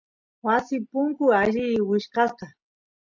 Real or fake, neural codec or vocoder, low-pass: real; none; 7.2 kHz